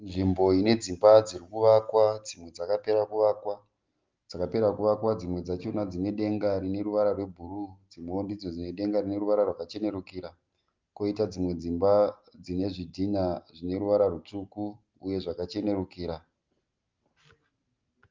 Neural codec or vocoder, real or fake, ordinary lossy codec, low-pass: none; real; Opus, 32 kbps; 7.2 kHz